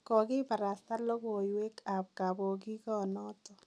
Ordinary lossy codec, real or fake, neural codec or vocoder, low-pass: none; real; none; none